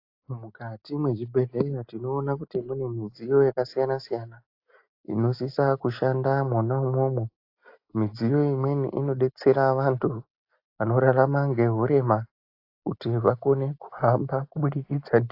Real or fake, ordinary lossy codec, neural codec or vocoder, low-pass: real; AAC, 32 kbps; none; 5.4 kHz